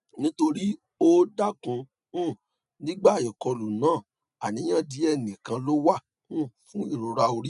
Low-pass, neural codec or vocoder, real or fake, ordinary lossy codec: 10.8 kHz; none; real; none